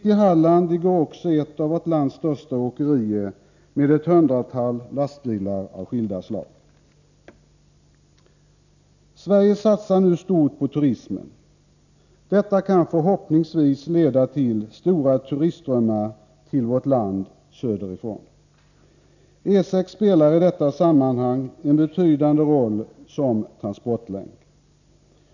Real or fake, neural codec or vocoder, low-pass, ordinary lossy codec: real; none; 7.2 kHz; none